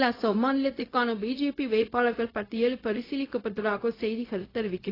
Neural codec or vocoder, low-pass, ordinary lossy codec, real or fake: codec, 16 kHz, 0.4 kbps, LongCat-Audio-Codec; 5.4 kHz; AAC, 24 kbps; fake